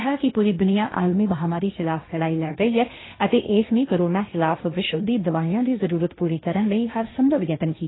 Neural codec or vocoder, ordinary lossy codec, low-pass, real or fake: codec, 16 kHz, 1.1 kbps, Voila-Tokenizer; AAC, 16 kbps; 7.2 kHz; fake